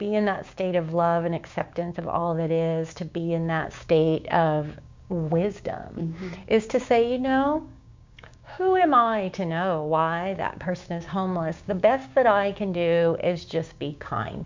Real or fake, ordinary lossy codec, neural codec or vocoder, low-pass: fake; MP3, 64 kbps; codec, 16 kHz, 6 kbps, DAC; 7.2 kHz